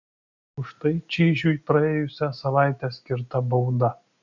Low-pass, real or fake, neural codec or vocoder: 7.2 kHz; real; none